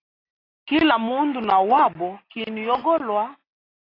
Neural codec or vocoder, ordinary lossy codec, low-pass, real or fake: none; AAC, 24 kbps; 5.4 kHz; real